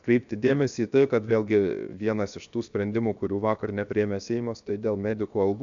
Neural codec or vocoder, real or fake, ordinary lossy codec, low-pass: codec, 16 kHz, 0.7 kbps, FocalCodec; fake; AAC, 64 kbps; 7.2 kHz